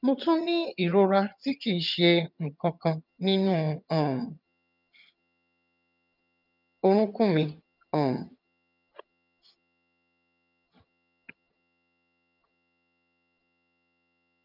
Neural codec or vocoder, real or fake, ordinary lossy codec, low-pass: vocoder, 22.05 kHz, 80 mel bands, HiFi-GAN; fake; none; 5.4 kHz